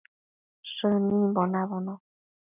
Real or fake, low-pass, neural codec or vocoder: real; 3.6 kHz; none